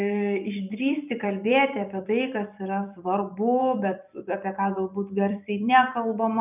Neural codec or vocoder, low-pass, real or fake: vocoder, 44.1 kHz, 128 mel bands every 256 samples, BigVGAN v2; 3.6 kHz; fake